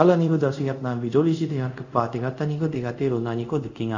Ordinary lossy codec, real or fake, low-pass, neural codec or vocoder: none; fake; 7.2 kHz; codec, 24 kHz, 0.5 kbps, DualCodec